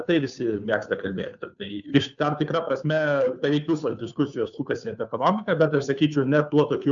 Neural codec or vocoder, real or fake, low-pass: codec, 16 kHz, 2 kbps, FunCodec, trained on Chinese and English, 25 frames a second; fake; 7.2 kHz